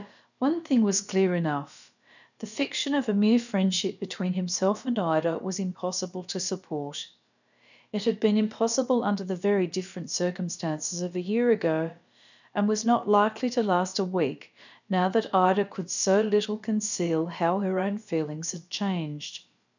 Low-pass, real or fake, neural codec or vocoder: 7.2 kHz; fake; codec, 16 kHz, about 1 kbps, DyCAST, with the encoder's durations